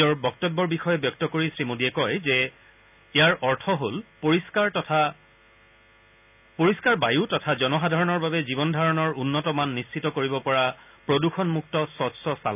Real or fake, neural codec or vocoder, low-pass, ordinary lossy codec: real; none; 3.6 kHz; none